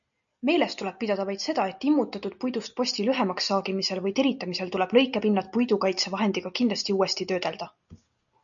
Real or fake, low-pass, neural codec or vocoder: real; 7.2 kHz; none